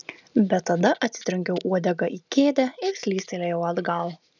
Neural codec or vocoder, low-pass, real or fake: none; 7.2 kHz; real